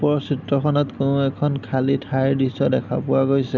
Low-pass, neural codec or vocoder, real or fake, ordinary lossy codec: 7.2 kHz; none; real; none